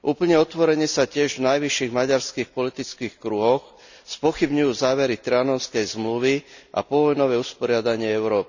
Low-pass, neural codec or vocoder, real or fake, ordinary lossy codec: 7.2 kHz; none; real; none